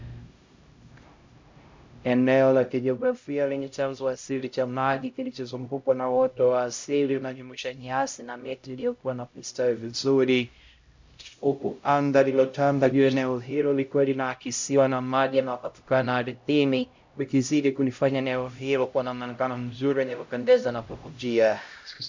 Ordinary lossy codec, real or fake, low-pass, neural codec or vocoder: MP3, 64 kbps; fake; 7.2 kHz; codec, 16 kHz, 0.5 kbps, X-Codec, HuBERT features, trained on LibriSpeech